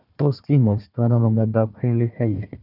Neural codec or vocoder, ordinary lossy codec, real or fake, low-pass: codec, 16 kHz, 1 kbps, FunCodec, trained on Chinese and English, 50 frames a second; none; fake; 5.4 kHz